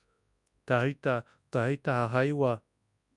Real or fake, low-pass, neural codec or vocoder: fake; 10.8 kHz; codec, 24 kHz, 0.9 kbps, WavTokenizer, large speech release